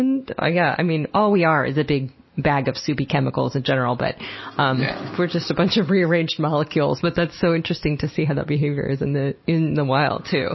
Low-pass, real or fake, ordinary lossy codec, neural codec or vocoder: 7.2 kHz; real; MP3, 24 kbps; none